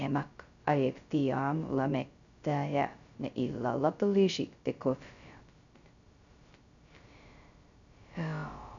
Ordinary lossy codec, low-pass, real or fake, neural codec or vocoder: none; 7.2 kHz; fake; codec, 16 kHz, 0.2 kbps, FocalCodec